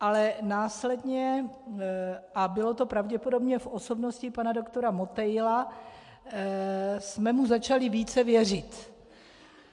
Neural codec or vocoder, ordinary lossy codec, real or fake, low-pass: none; MP3, 64 kbps; real; 10.8 kHz